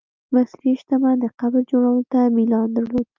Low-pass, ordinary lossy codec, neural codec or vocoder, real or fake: 7.2 kHz; Opus, 24 kbps; none; real